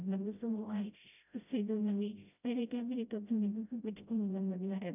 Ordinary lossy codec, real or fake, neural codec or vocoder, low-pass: none; fake; codec, 16 kHz, 0.5 kbps, FreqCodec, smaller model; 3.6 kHz